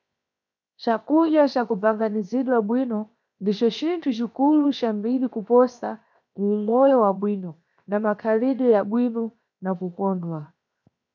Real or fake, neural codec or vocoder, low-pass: fake; codec, 16 kHz, 0.7 kbps, FocalCodec; 7.2 kHz